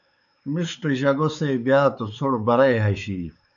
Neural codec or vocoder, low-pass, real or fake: codec, 16 kHz, 4 kbps, X-Codec, WavLM features, trained on Multilingual LibriSpeech; 7.2 kHz; fake